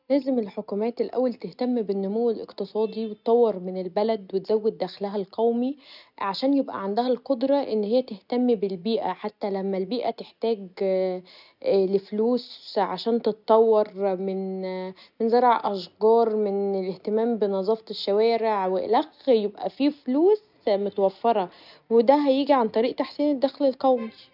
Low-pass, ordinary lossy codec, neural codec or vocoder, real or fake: 5.4 kHz; none; none; real